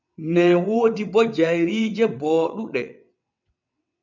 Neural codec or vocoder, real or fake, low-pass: vocoder, 22.05 kHz, 80 mel bands, WaveNeXt; fake; 7.2 kHz